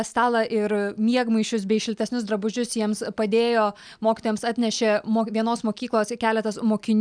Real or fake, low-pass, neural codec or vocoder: real; 9.9 kHz; none